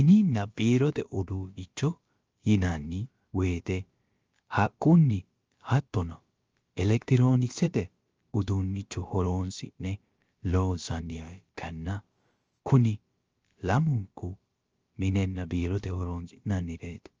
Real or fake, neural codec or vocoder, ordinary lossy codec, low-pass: fake; codec, 16 kHz, about 1 kbps, DyCAST, with the encoder's durations; Opus, 24 kbps; 7.2 kHz